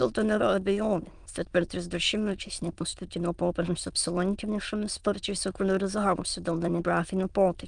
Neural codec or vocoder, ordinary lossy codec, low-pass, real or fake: autoencoder, 22.05 kHz, a latent of 192 numbers a frame, VITS, trained on many speakers; Opus, 32 kbps; 9.9 kHz; fake